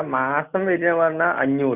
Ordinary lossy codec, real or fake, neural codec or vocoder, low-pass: none; real; none; 3.6 kHz